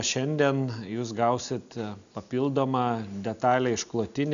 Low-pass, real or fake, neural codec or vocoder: 7.2 kHz; real; none